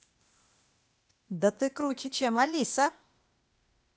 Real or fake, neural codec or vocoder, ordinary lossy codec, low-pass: fake; codec, 16 kHz, 0.8 kbps, ZipCodec; none; none